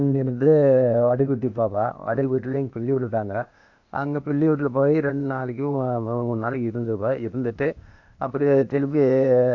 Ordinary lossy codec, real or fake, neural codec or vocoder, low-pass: AAC, 48 kbps; fake; codec, 16 kHz, 0.8 kbps, ZipCodec; 7.2 kHz